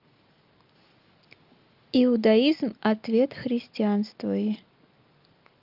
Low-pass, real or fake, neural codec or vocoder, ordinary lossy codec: 5.4 kHz; real; none; Opus, 24 kbps